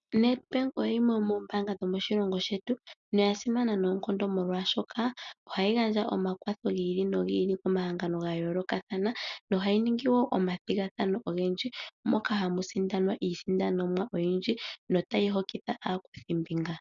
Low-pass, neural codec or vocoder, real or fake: 7.2 kHz; none; real